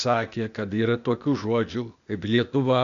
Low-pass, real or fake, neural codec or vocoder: 7.2 kHz; fake; codec, 16 kHz, 0.8 kbps, ZipCodec